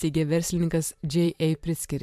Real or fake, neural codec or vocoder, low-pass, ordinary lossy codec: fake; vocoder, 44.1 kHz, 128 mel bands every 512 samples, BigVGAN v2; 14.4 kHz; MP3, 96 kbps